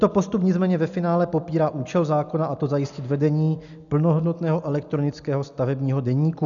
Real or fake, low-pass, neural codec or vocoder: real; 7.2 kHz; none